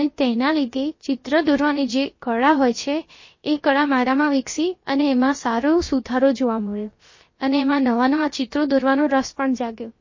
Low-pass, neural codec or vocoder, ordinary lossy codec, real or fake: 7.2 kHz; codec, 16 kHz, about 1 kbps, DyCAST, with the encoder's durations; MP3, 32 kbps; fake